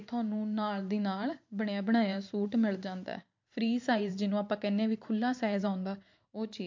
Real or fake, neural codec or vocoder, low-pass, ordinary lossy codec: fake; vocoder, 44.1 kHz, 128 mel bands every 512 samples, BigVGAN v2; 7.2 kHz; MP3, 48 kbps